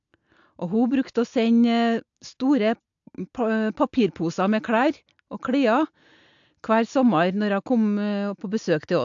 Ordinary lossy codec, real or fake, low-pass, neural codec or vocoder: AAC, 64 kbps; real; 7.2 kHz; none